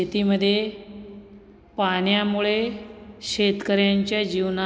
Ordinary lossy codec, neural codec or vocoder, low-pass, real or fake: none; none; none; real